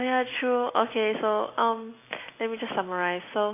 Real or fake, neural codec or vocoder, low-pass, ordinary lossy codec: real; none; 3.6 kHz; AAC, 24 kbps